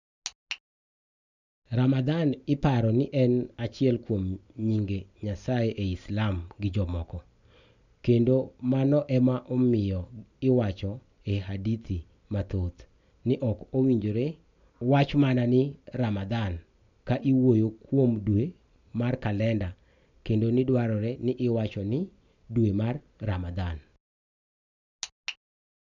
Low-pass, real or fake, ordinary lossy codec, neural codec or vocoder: 7.2 kHz; real; none; none